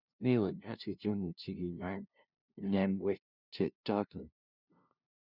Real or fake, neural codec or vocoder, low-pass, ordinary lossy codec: fake; codec, 16 kHz, 0.5 kbps, FunCodec, trained on LibriTTS, 25 frames a second; 5.4 kHz; none